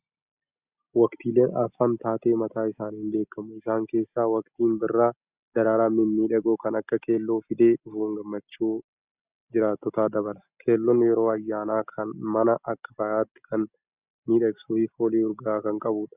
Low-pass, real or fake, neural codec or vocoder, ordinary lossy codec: 3.6 kHz; real; none; Opus, 64 kbps